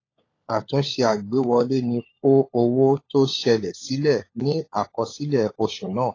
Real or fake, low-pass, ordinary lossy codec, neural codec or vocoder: fake; 7.2 kHz; AAC, 32 kbps; codec, 16 kHz, 16 kbps, FunCodec, trained on LibriTTS, 50 frames a second